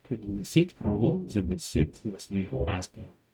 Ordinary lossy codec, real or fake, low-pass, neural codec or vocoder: none; fake; 19.8 kHz; codec, 44.1 kHz, 0.9 kbps, DAC